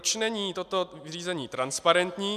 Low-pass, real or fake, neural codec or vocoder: 14.4 kHz; real; none